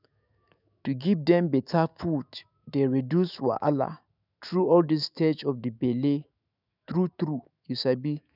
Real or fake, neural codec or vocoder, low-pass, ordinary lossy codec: fake; autoencoder, 48 kHz, 128 numbers a frame, DAC-VAE, trained on Japanese speech; 5.4 kHz; none